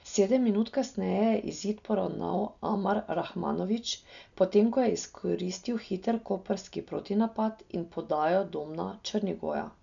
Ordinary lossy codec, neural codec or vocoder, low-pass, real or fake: none; none; 7.2 kHz; real